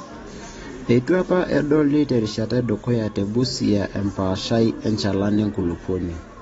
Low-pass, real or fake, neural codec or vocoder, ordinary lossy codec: 19.8 kHz; real; none; AAC, 24 kbps